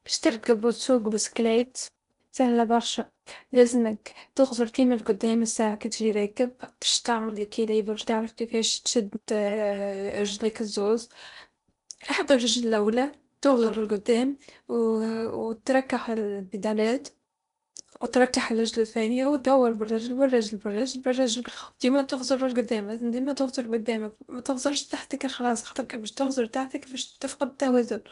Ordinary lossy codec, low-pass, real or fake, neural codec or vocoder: none; 10.8 kHz; fake; codec, 16 kHz in and 24 kHz out, 0.8 kbps, FocalCodec, streaming, 65536 codes